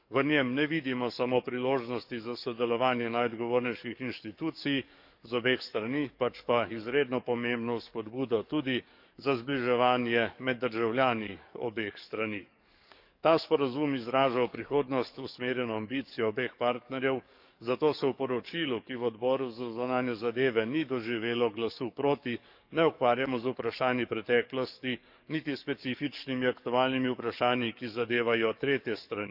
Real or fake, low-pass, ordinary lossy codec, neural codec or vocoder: fake; 5.4 kHz; none; codec, 44.1 kHz, 7.8 kbps, DAC